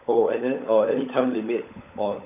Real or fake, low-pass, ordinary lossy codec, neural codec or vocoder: fake; 3.6 kHz; none; codec, 16 kHz, 16 kbps, FunCodec, trained on LibriTTS, 50 frames a second